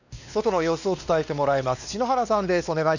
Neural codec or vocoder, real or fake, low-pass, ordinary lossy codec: codec, 16 kHz, 2 kbps, X-Codec, WavLM features, trained on Multilingual LibriSpeech; fake; 7.2 kHz; none